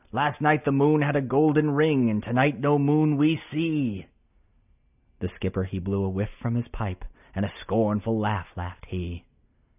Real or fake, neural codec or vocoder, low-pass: real; none; 3.6 kHz